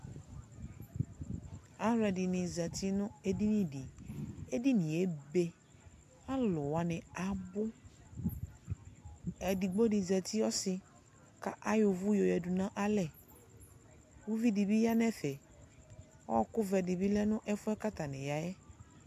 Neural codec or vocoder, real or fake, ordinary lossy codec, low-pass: none; real; MP3, 64 kbps; 14.4 kHz